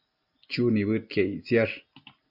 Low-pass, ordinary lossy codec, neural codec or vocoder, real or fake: 5.4 kHz; MP3, 48 kbps; none; real